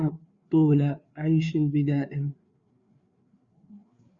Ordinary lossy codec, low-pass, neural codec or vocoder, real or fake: Opus, 64 kbps; 7.2 kHz; codec, 16 kHz, 4 kbps, FreqCodec, larger model; fake